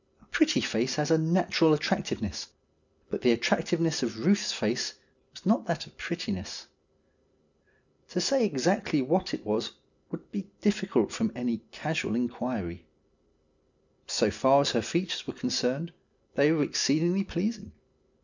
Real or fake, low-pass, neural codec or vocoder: real; 7.2 kHz; none